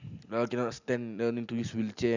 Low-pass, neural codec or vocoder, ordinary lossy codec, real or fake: 7.2 kHz; none; none; real